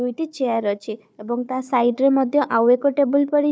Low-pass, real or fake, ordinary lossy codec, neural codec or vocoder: none; fake; none; codec, 16 kHz, 4 kbps, FunCodec, trained on Chinese and English, 50 frames a second